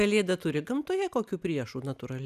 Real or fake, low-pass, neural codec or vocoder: real; 14.4 kHz; none